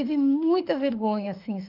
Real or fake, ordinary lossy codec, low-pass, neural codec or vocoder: fake; Opus, 32 kbps; 5.4 kHz; autoencoder, 48 kHz, 32 numbers a frame, DAC-VAE, trained on Japanese speech